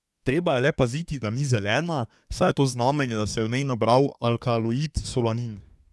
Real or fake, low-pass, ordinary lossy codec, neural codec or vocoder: fake; none; none; codec, 24 kHz, 1 kbps, SNAC